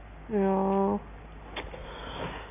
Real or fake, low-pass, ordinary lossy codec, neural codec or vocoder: real; 3.6 kHz; none; none